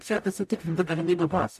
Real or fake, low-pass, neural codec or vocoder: fake; 14.4 kHz; codec, 44.1 kHz, 0.9 kbps, DAC